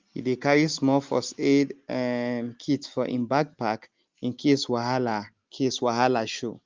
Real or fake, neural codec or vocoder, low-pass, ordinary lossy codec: real; none; 7.2 kHz; Opus, 32 kbps